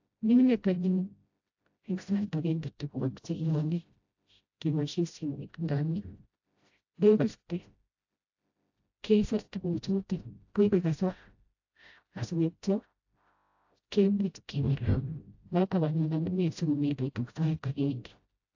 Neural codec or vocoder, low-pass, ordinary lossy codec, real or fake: codec, 16 kHz, 0.5 kbps, FreqCodec, smaller model; 7.2 kHz; AAC, 48 kbps; fake